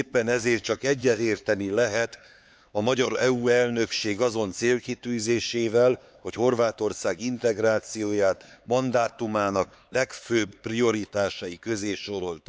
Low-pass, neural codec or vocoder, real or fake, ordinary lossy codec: none; codec, 16 kHz, 4 kbps, X-Codec, HuBERT features, trained on LibriSpeech; fake; none